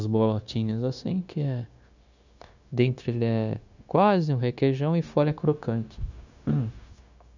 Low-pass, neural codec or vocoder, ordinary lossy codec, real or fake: 7.2 kHz; codec, 16 kHz, 0.9 kbps, LongCat-Audio-Codec; none; fake